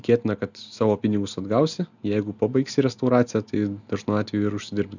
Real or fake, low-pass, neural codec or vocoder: real; 7.2 kHz; none